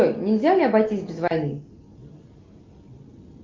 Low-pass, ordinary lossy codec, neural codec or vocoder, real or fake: 7.2 kHz; Opus, 24 kbps; none; real